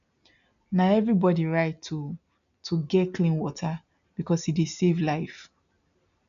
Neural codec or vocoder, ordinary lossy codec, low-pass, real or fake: none; none; 7.2 kHz; real